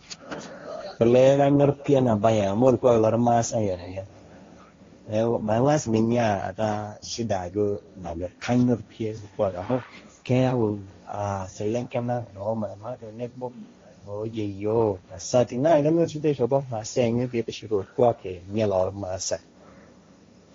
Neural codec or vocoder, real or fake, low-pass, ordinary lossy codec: codec, 16 kHz, 1.1 kbps, Voila-Tokenizer; fake; 7.2 kHz; AAC, 32 kbps